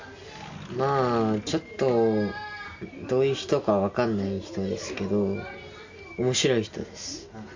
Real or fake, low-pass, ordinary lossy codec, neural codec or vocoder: real; 7.2 kHz; MP3, 64 kbps; none